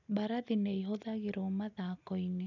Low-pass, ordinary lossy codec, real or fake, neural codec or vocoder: 7.2 kHz; none; real; none